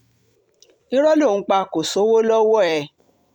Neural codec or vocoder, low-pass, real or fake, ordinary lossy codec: vocoder, 44.1 kHz, 128 mel bands every 256 samples, BigVGAN v2; 19.8 kHz; fake; none